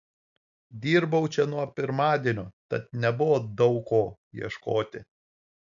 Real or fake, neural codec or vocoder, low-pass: real; none; 7.2 kHz